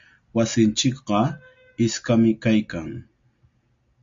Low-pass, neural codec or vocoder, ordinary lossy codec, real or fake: 7.2 kHz; none; MP3, 48 kbps; real